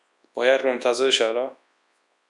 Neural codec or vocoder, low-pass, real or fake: codec, 24 kHz, 0.9 kbps, WavTokenizer, large speech release; 10.8 kHz; fake